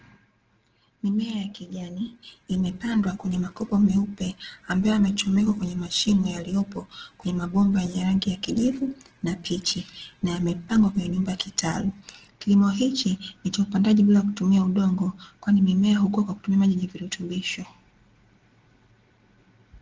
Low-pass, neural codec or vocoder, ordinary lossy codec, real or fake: 7.2 kHz; none; Opus, 16 kbps; real